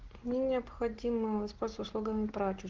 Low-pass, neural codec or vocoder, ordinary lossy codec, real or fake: 7.2 kHz; none; Opus, 16 kbps; real